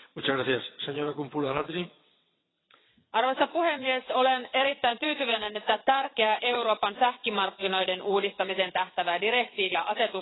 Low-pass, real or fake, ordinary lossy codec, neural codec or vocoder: 7.2 kHz; fake; AAC, 16 kbps; vocoder, 22.05 kHz, 80 mel bands, WaveNeXt